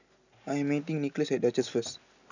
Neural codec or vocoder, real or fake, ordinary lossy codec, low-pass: none; real; none; 7.2 kHz